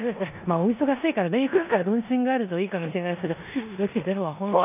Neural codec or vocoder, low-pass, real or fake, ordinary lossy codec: codec, 16 kHz in and 24 kHz out, 0.9 kbps, LongCat-Audio-Codec, four codebook decoder; 3.6 kHz; fake; none